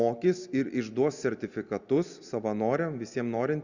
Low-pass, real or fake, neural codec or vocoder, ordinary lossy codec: 7.2 kHz; real; none; Opus, 64 kbps